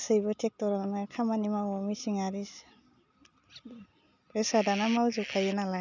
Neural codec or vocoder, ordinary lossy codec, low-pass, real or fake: none; none; 7.2 kHz; real